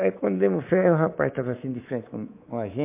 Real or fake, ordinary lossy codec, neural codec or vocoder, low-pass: fake; MP3, 24 kbps; codec, 24 kHz, 3.1 kbps, DualCodec; 3.6 kHz